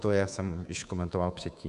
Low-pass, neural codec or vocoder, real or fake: 10.8 kHz; codec, 24 kHz, 3.1 kbps, DualCodec; fake